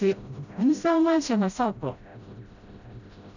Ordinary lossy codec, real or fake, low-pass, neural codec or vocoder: none; fake; 7.2 kHz; codec, 16 kHz, 0.5 kbps, FreqCodec, smaller model